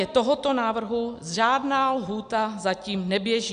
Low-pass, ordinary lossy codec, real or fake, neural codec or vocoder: 9.9 kHz; MP3, 96 kbps; real; none